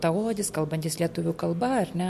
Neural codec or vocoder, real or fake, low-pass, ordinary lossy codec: none; real; 14.4 kHz; MP3, 64 kbps